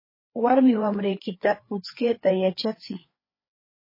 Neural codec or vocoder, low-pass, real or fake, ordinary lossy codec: codec, 16 kHz, 4 kbps, FreqCodec, larger model; 5.4 kHz; fake; MP3, 24 kbps